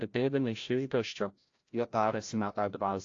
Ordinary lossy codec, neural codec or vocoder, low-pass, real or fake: AAC, 48 kbps; codec, 16 kHz, 0.5 kbps, FreqCodec, larger model; 7.2 kHz; fake